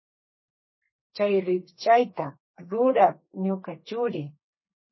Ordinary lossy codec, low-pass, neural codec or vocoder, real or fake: MP3, 24 kbps; 7.2 kHz; codec, 32 kHz, 1.9 kbps, SNAC; fake